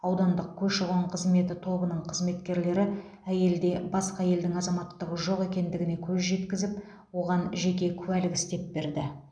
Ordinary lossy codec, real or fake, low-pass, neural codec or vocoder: none; real; 9.9 kHz; none